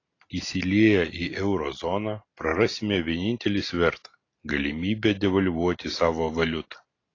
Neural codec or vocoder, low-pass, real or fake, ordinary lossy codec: none; 7.2 kHz; real; AAC, 32 kbps